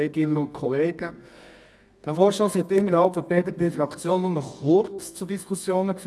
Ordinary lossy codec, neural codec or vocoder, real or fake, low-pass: none; codec, 24 kHz, 0.9 kbps, WavTokenizer, medium music audio release; fake; none